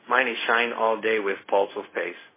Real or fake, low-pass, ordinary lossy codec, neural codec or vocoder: fake; 3.6 kHz; MP3, 16 kbps; codec, 16 kHz, 0.4 kbps, LongCat-Audio-Codec